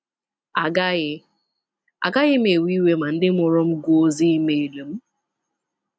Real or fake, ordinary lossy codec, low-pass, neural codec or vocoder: real; none; none; none